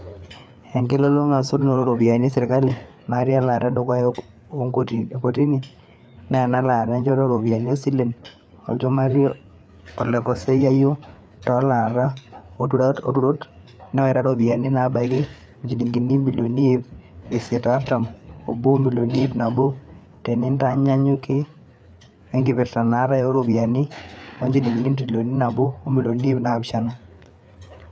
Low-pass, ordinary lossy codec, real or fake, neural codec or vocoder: none; none; fake; codec, 16 kHz, 4 kbps, FreqCodec, larger model